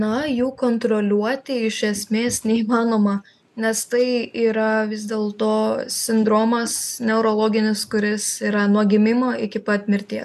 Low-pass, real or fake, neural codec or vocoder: 14.4 kHz; real; none